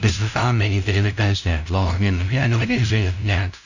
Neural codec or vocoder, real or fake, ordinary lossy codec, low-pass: codec, 16 kHz, 0.5 kbps, FunCodec, trained on LibriTTS, 25 frames a second; fake; none; 7.2 kHz